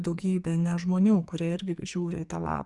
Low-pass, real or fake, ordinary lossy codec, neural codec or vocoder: 10.8 kHz; fake; Opus, 64 kbps; codec, 44.1 kHz, 2.6 kbps, SNAC